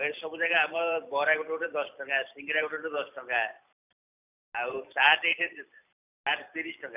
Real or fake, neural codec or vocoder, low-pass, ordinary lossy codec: fake; vocoder, 44.1 kHz, 128 mel bands every 256 samples, BigVGAN v2; 3.6 kHz; none